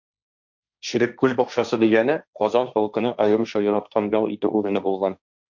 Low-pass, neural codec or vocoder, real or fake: 7.2 kHz; codec, 16 kHz, 1.1 kbps, Voila-Tokenizer; fake